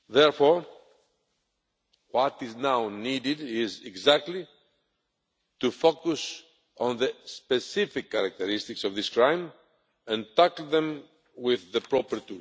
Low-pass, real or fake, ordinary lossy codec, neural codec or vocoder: none; real; none; none